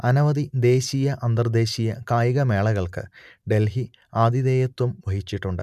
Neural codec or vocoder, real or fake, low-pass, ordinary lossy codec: none; real; 14.4 kHz; none